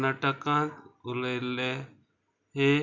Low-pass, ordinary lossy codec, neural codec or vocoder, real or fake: 7.2 kHz; MP3, 64 kbps; none; real